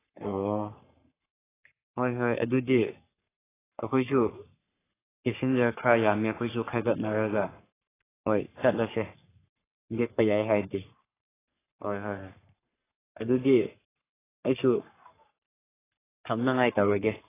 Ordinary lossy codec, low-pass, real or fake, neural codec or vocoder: AAC, 16 kbps; 3.6 kHz; fake; codec, 44.1 kHz, 3.4 kbps, Pupu-Codec